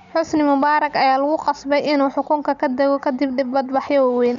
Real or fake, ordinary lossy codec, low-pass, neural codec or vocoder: real; none; 7.2 kHz; none